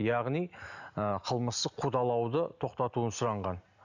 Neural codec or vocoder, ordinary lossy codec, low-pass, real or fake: none; none; 7.2 kHz; real